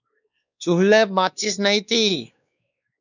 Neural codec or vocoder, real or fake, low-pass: codec, 16 kHz, 2 kbps, X-Codec, WavLM features, trained on Multilingual LibriSpeech; fake; 7.2 kHz